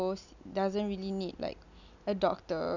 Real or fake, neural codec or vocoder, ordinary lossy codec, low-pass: real; none; none; 7.2 kHz